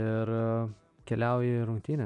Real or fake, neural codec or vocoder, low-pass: real; none; 10.8 kHz